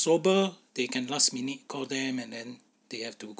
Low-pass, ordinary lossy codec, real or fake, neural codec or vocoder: none; none; real; none